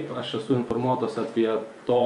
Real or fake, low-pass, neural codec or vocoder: fake; 10.8 kHz; vocoder, 24 kHz, 100 mel bands, Vocos